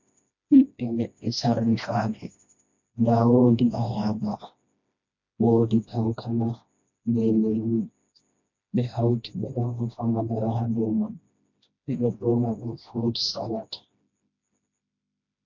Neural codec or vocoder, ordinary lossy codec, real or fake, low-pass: codec, 16 kHz, 1 kbps, FreqCodec, smaller model; MP3, 48 kbps; fake; 7.2 kHz